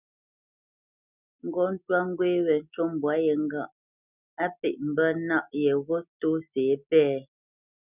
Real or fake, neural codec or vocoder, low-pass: real; none; 3.6 kHz